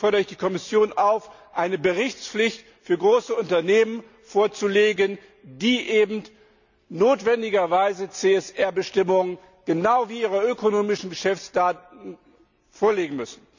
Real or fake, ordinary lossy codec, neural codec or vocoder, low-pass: real; none; none; 7.2 kHz